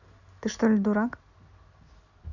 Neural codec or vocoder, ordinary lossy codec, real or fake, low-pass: none; none; real; 7.2 kHz